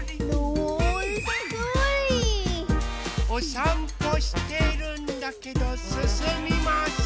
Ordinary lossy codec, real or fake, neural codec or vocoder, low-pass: none; real; none; none